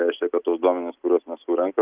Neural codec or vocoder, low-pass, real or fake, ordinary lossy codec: none; 3.6 kHz; real; Opus, 24 kbps